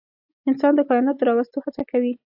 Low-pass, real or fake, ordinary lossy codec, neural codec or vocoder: 5.4 kHz; real; AAC, 48 kbps; none